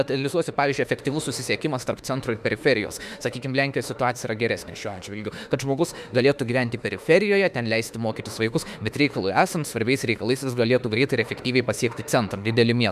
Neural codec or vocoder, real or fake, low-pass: autoencoder, 48 kHz, 32 numbers a frame, DAC-VAE, trained on Japanese speech; fake; 14.4 kHz